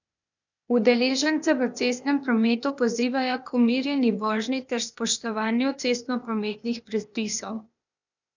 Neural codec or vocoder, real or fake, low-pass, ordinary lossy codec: codec, 16 kHz, 0.8 kbps, ZipCodec; fake; 7.2 kHz; none